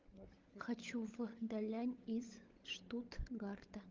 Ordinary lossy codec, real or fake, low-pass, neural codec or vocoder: Opus, 32 kbps; fake; 7.2 kHz; codec, 16 kHz, 16 kbps, FunCodec, trained on LibriTTS, 50 frames a second